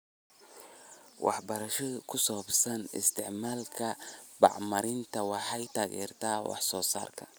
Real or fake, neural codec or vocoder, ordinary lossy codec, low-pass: real; none; none; none